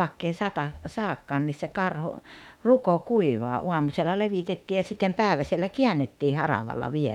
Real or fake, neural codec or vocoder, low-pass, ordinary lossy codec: fake; autoencoder, 48 kHz, 32 numbers a frame, DAC-VAE, trained on Japanese speech; 19.8 kHz; none